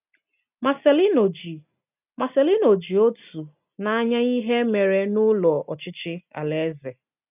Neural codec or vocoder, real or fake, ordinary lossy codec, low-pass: none; real; none; 3.6 kHz